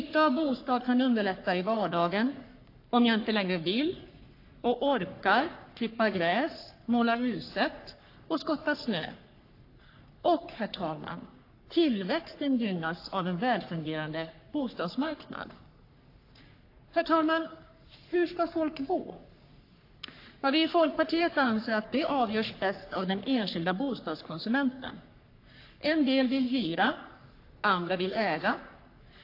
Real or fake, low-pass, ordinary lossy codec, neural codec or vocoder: fake; 5.4 kHz; AAC, 32 kbps; codec, 44.1 kHz, 3.4 kbps, Pupu-Codec